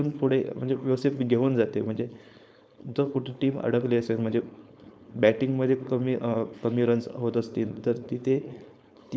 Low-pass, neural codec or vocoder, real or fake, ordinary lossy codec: none; codec, 16 kHz, 4.8 kbps, FACodec; fake; none